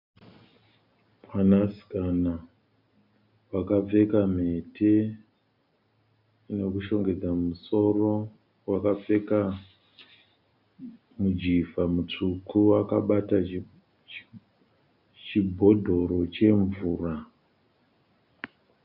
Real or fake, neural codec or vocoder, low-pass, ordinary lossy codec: real; none; 5.4 kHz; Opus, 64 kbps